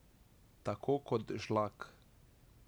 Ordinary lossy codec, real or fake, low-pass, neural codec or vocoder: none; real; none; none